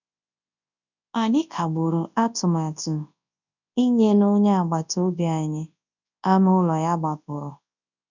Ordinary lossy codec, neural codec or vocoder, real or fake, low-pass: none; codec, 24 kHz, 0.9 kbps, WavTokenizer, large speech release; fake; 7.2 kHz